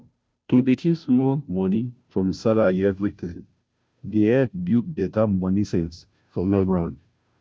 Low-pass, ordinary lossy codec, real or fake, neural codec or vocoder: none; none; fake; codec, 16 kHz, 0.5 kbps, FunCodec, trained on Chinese and English, 25 frames a second